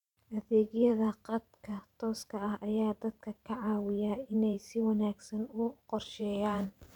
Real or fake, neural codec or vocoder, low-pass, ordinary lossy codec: fake; vocoder, 44.1 kHz, 128 mel bands every 512 samples, BigVGAN v2; 19.8 kHz; none